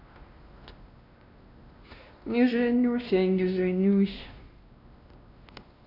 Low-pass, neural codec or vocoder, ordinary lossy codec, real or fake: 5.4 kHz; codec, 16 kHz, 1 kbps, X-Codec, WavLM features, trained on Multilingual LibriSpeech; none; fake